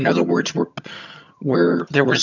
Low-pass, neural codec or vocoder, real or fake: 7.2 kHz; vocoder, 22.05 kHz, 80 mel bands, HiFi-GAN; fake